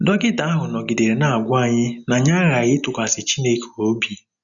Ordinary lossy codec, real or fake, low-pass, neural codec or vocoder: none; real; 7.2 kHz; none